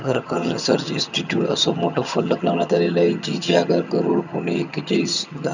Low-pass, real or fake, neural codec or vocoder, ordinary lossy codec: 7.2 kHz; fake; vocoder, 22.05 kHz, 80 mel bands, HiFi-GAN; none